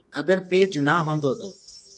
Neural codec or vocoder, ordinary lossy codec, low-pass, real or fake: codec, 24 kHz, 0.9 kbps, WavTokenizer, medium music audio release; MP3, 64 kbps; 10.8 kHz; fake